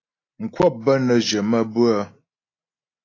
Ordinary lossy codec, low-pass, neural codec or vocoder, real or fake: AAC, 32 kbps; 7.2 kHz; none; real